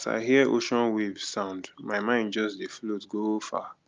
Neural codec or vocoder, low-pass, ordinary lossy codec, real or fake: none; 7.2 kHz; Opus, 32 kbps; real